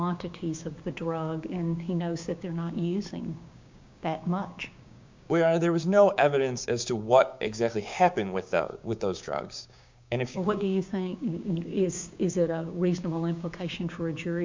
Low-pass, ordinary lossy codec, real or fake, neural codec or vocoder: 7.2 kHz; MP3, 64 kbps; fake; codec, 16 kHz, 6 kbps, DAC